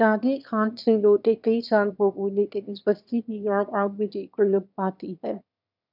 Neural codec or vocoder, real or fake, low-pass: autoencoder, 22.05 kHz, a latent of 192 numbers a frame, VITS, trained on one speaker; fake; 5.4 kHz